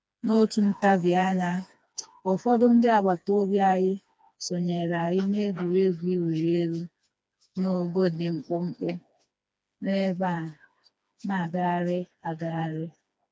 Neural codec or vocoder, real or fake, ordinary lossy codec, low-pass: codec, 16 kHz, 2 kbps, FreqCodec, smaller model; fake; none; none